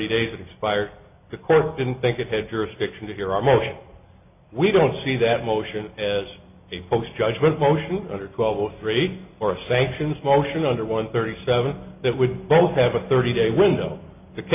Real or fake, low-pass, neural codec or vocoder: real; 3.6 kHz; none